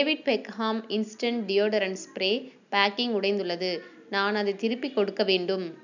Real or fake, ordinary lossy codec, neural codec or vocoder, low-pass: real; none; none; 7.2 kHz